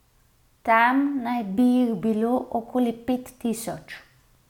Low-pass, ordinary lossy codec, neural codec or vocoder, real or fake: 19.8 kHz; none; none; real